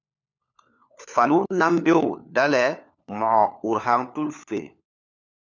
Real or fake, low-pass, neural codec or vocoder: fake; 7.2 kHz; codec, 16 kHz, 4 kbps, FunCodec, trained on LibriTTS, 50 frames a second